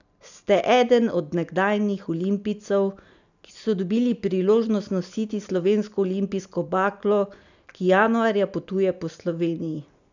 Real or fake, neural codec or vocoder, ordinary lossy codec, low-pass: real; none; none; 7.2 kHz